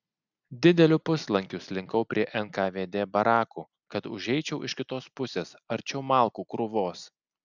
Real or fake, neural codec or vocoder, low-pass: real; none; 7.2 kHz